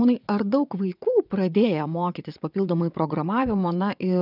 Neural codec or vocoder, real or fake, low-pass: vocoder, 22.05 kHz, 80 mel bands, WaveNeXt; fake; 5.4 kHz